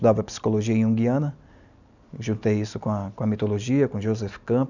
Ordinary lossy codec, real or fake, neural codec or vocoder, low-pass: none; real; none; 7.2 kHz